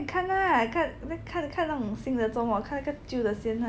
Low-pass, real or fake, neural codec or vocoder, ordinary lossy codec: none; real; none; none